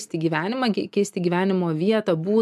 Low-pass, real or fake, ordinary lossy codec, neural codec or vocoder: 14.4 kHz; real; MP3, 96 kbps; none